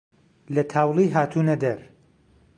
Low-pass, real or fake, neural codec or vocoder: 9.9 kHz; real; none